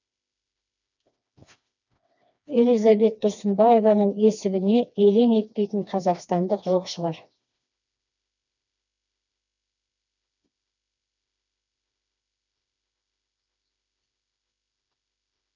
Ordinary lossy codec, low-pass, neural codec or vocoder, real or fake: none; 7.2 kHz; codec, 16 kHz, 2 kbps, FreqCodec, smaller model; fake